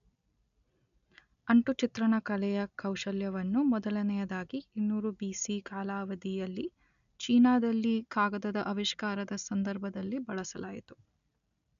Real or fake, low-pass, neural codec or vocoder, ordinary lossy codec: real; 7.2 kHz; none; none